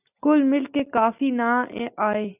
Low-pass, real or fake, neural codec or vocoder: 3.6 kHz; real; none